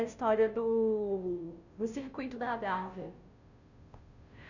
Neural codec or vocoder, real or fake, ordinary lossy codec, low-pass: codec, 16 kHz, 0.5 kbps, FunCodec, trained on Chinese and English, 25 frames a second; fake; none; 7.2 kHz